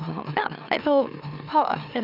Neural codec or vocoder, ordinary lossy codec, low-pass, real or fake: autoencoder, 44.1 kHz, a latent of 192 numbers a frame, MeloTTS; none; 5.4 kHz; fake